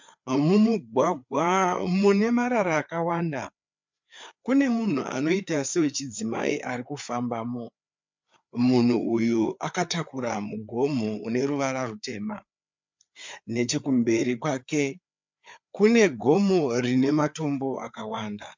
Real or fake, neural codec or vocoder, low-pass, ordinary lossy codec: fake; codec, 16 kHz, 4 kbps, FreqCodec, larger model; 7.2 kHz; MP3, 64 kbps